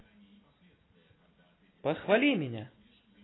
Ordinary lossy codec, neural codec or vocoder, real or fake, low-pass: AAC, 16 kbps; none; real; 7.2 kHz